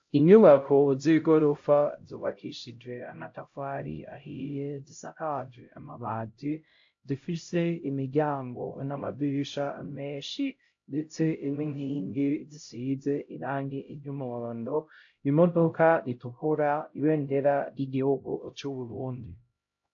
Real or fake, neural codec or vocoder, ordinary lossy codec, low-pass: fake; codec, 16 kHz, 0.5 kbps, X-Codec, HuBERT features, trained on LibriSpeech; AAC, 48 kbps; 7.2 kHz